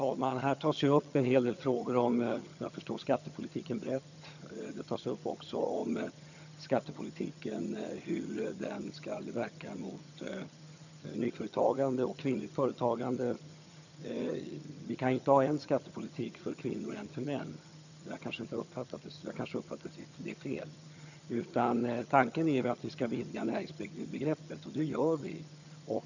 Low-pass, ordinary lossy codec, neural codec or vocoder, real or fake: 7.2 kHz; none; vocoder, 22.05 kHz, 80 mel bands, HiFi-GAN; fake